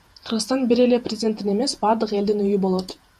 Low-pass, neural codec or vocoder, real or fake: 14.4 kHz; vocoder, 48 kHz, 128 mel bands, Vocos; fake